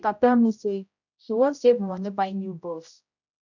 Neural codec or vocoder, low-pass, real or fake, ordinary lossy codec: codec, 16 kHz, 0.5 kbps, X-Codec, HuBERT features, trained on general audio; 7.2 kHz; fake; none